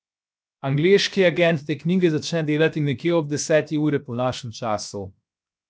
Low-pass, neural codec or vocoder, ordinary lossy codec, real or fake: none; codec, 16 kHz, 0.7 kbps, FocalCodec; none; fake